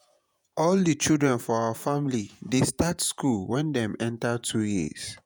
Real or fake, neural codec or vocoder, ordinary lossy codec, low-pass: fake; vocoder, 48 kHz, 128 mel bands, Vocos; none; none